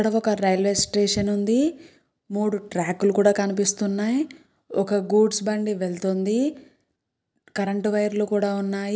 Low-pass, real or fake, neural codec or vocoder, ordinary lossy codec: none; real; none; none